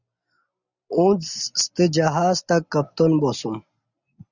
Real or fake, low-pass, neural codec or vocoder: real; 7.2 kHz; none